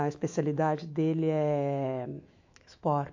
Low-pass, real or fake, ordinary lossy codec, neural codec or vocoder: 7.2 kHz; fake; AAC, 48 kbps; autoencoder, 48 kHz, 128 numbers a frame, DAC-VAE, trained on Japanese speech